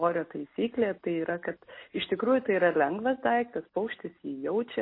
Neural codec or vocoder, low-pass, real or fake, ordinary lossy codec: none; 5.4 kHz; real; MP3, 24 kbps